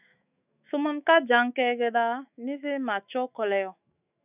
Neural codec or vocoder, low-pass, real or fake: autoencoder, 48 kHz, 128 numbers a frame, DAC-VAE, trained on Japanese speech; 3.6 kHz; fake